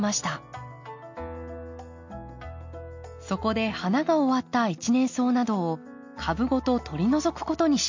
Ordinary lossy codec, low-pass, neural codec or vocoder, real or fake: MP3, 48 kbps; 7.2 kHz; none; real